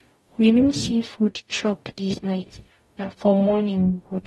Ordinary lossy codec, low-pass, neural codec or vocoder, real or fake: AAC, 32 kbps; 19.8 kHz; codec, 44.1 kHz, 0.9 kbps, DAC; fake